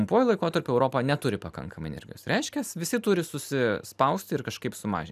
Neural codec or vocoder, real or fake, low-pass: none; real; 14.4 kHz